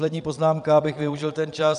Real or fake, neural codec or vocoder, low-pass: fake; vocoder, 22.05 kHz, 80 mel bands, Vocos; 9.9 kHz